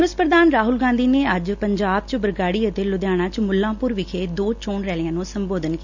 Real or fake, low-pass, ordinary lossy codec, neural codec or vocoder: real; 7.2 kHz; none; none